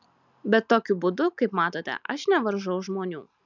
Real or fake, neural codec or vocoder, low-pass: real; none; 7.2 kHz